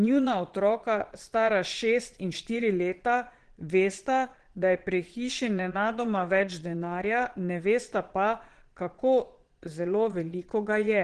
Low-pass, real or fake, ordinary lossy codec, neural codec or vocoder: 9.9 kHz; fake; Opus, 16 kbps; vocoder, 22.05 kHz, 80 mel bands, Vocos